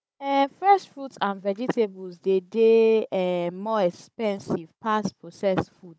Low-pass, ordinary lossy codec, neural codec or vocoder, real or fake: none; none; codec, 16 kHz, 16 kbps, FunCodec, trained on Chinese and English, 50 frames a second; fake